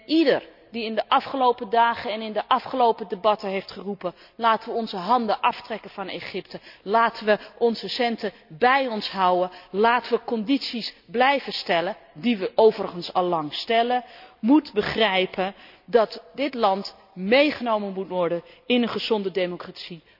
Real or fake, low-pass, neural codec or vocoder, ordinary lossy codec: real; 5.4 kHz; none; none